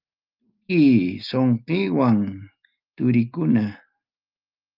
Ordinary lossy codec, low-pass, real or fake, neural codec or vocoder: Opus, 32 kbps; 5.4 kHz; real; none